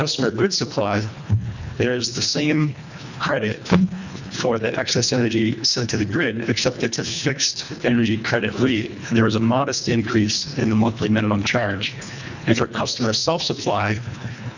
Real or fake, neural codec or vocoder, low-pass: fake; codec, 24 kHz, 1.5 kbps, HILCodec; 7.2 kHz